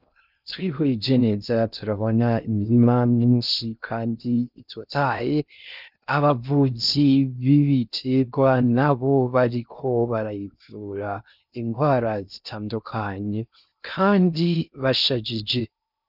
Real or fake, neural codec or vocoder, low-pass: fake; codec, 16 kHz in and 24 kHz out, 0.6 kbps, FocalCodec, streaming, 2048 codes; 5.4 kHz